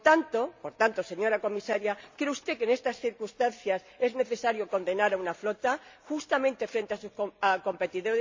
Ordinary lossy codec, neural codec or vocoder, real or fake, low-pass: MP3, 64 kbps; none; real; 7.2 kHz